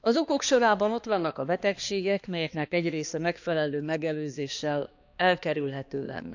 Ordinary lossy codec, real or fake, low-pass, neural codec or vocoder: none; fake; 7.2 kHz; codec, 16 kHz, 2 kbps, X-Codec, HuBERT features, trained on balanced general audio